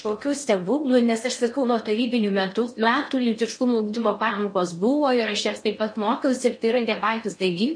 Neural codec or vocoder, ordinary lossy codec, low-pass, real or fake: codec, 16 kHz in and 24 kHz out, 0.6 kbps, FocalCodec, streaming, 4096 codes; MP3, 48 kbps; 9.9 kHz; fake